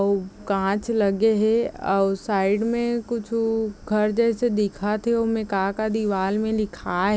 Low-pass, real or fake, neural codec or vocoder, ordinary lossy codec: none; real; none; none